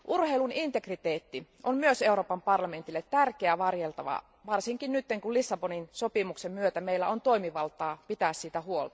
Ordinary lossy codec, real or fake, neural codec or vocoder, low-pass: none; real; none; none